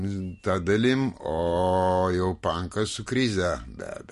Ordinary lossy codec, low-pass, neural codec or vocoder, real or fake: MP3, 48 kbps; 14.4 kHz; none; real